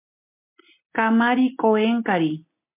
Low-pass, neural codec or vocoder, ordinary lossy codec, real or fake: 3.6 kHz; none; MP3, 32 kbps; real